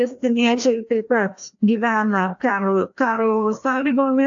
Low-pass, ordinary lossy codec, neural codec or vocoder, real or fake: 7.2 kHz; AAC, 64 kbps; codec, 16 kHz, 1 kbps, FreqCodec, larger model; fake